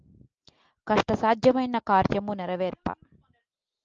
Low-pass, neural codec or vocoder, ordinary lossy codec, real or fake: 7.2 kHz; none; Opus, 24 kbps; real